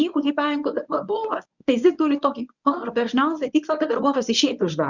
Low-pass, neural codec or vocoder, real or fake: 7.2 kHz; codec, 24 kHz, 0.9 kbps, WavTokenizer, medium speech release version 1; fake